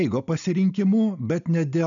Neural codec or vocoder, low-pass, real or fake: none; 7.2 kHz; real